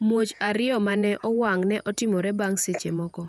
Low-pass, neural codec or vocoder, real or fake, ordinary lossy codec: 14.4 kHz; vocoder, 48 kHz, 128 mel bands, Vocos; fake; none